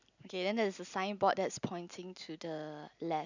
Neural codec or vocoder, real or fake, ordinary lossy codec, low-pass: none; real; none; 7.2 kHz